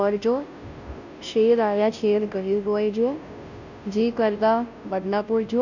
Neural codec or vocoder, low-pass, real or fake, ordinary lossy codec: codec, 16 kHz, 0.5 kbps, FunCodec, trained on Chinese and English, 25 frames a second; 7.2 kHz; fake; none